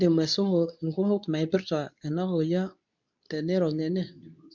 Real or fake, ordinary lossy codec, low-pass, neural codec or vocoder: fake; none; 7.2 kHz; codec, 24 kHz, 0.9 kbps, WavTokenizer, medium speech release version 2